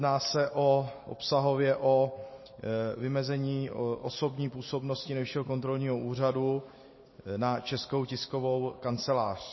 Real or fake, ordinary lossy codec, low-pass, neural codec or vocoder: real; MP3, 24 kbps; 7.2 kHz; none